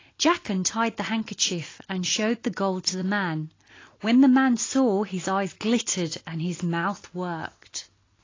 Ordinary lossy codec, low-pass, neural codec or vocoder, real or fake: AAC, 32 kbps; 7.2 kHz; none; real